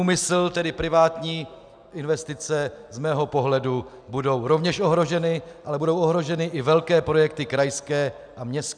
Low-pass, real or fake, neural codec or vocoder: 9.9 kHz; real; none